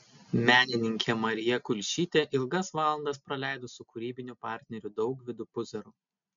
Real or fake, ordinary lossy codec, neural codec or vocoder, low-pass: real; MP3, 64 kbps; none; 7.2 kHz